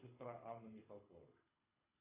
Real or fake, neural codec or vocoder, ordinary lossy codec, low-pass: fake; codec, 24 kHz, 6 kbps, HILCodec; AAC, 32 kbps; 3.6 kHz